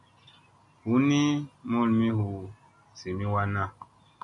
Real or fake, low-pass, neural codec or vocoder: real; 10.8 kHz; none